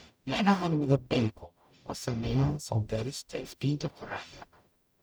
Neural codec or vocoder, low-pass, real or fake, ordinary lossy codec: codec, 44.1 kHz, 0.9 kbps, DAC; none; fake; none